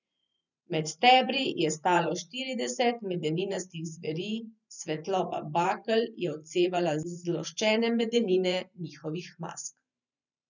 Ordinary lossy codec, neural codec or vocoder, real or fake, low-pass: none; vocoder, 44.1 kHz, 128 mel bands every 512 samples, BigVGAN v2; fake; 7.2 kHz